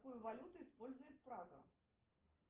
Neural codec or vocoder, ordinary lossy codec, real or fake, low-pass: none; Opus, 16 kbps; real; 3.6 kHz